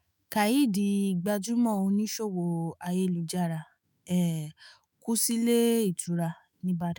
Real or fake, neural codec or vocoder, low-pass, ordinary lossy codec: fake; autoencoder, 48 kHz, 128 numbers a frame, DAC-VAE, trained on Japanese speech; none; none